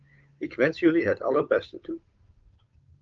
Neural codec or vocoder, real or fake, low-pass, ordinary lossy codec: codec, 16 kHz, 8 kbps, FunCodec, trained on Chinese and English, 25 frames a second; fake; 7.2 kHz; Opus, 24 kbps